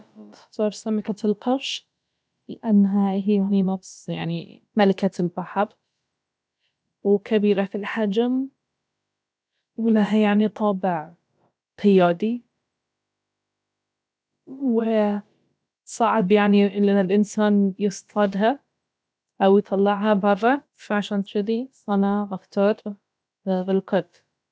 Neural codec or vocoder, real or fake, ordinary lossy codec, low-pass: codec, 16 kHz, about 1 kbps, DyCAST, with the encoder's durations; fake; none; none